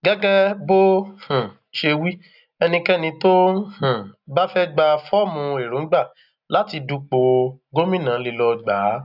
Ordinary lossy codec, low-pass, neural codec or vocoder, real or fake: none; 5.4 kHz; none; real